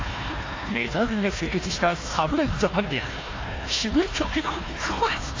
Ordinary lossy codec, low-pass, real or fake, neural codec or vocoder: AAC, 32 kbps; 7.2 kHz; fake; codec, 16 kHz, 1 kbps, FunCodec, trained on Chinese and English, 50 frames a second